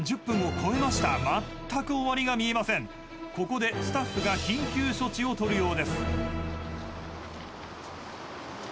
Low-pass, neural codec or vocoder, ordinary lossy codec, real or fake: none; none; none; real